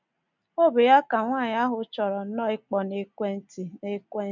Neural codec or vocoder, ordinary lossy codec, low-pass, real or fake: none; none; 7.2 kHz; real